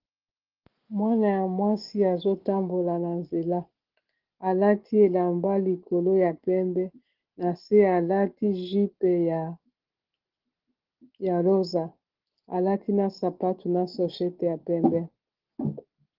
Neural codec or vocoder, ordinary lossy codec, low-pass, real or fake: none; Opus, 16 kbps; 5.4 kHz; real